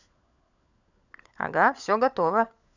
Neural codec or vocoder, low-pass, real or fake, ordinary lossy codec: codec, 16 kHz, 16 kbps, FunCodec, trained on LibriTTS, 50 frames a second; 7.2 kHz; fake; none